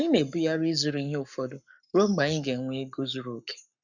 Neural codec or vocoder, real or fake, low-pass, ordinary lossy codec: codec, 44.1 kHz, 7.8 kbps, Pupu-Codec; fake; 7.2 kHz; none